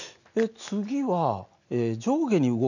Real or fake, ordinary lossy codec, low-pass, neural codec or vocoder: real; none; 7.2 kHz; none